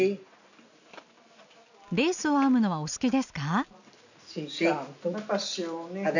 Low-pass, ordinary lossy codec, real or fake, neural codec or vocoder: 7.2 kHz; none; real; none